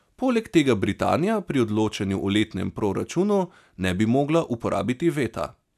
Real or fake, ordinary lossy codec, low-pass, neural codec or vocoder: real; none; 14.4 kHz; none